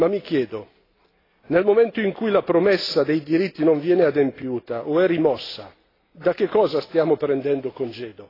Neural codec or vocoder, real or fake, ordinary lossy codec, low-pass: none; real; AAC, 24 kbps; 5.4 kHz